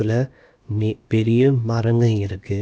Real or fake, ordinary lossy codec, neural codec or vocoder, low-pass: fake; none; codec, 16 kHz, about 1 kbps, DyCAST, with the encoder's durations; none